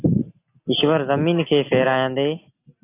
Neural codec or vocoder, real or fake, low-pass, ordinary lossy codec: none; real; 3.6 kHz; AAC, 24 kbps